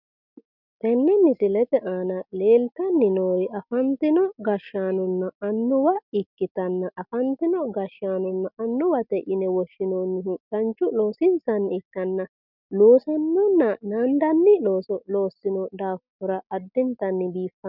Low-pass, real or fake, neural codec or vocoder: 5.4 kHz; real; none